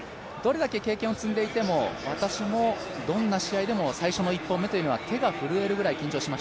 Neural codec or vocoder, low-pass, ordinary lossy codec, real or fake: none; none; none; real